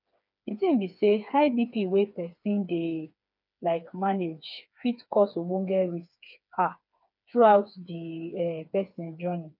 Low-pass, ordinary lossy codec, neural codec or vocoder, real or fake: 5.4 kHz; none; codec, 16 kHz, 4 kbps, FreqCodec, smaller model; fake